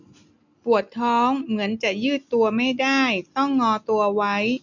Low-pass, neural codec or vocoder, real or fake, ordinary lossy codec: 7.2 kHz; none; real; none